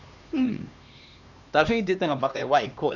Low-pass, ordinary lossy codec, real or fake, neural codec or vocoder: 7.2 kHz; none; fake; codec, 16 kHz, 2 kbps, X-Codec, HuBERT features, trained on LibriSpeech